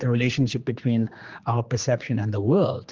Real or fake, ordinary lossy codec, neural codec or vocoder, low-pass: fake; Opus, 24 kbps; codec, 16 kHz, 2 kbps, X-Codec, HuBERT features, trained on general audio; 7.2 kHz